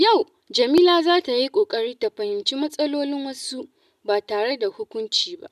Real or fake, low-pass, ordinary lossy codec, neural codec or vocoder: real; 14.4 kHz; none; none